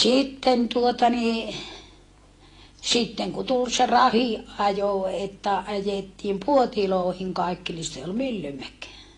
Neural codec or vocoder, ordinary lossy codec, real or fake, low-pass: vocoder, 48 kHz, 128 mel bands, Vocos; AAC, 32 kbps; fake; 10.8 kHz